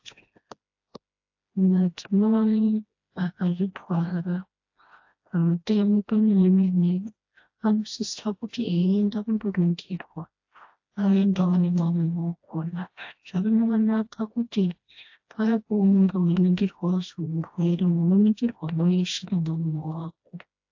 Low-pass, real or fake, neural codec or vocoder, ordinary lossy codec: 7.2 kHz; fake; codec, 16 kHz, 1 kbps, FreqCodec, smaller model; AAC, 48 kbps